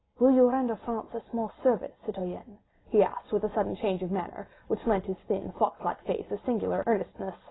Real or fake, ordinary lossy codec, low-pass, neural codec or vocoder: real; AAC, 16 kbps; 7.2 kHz; none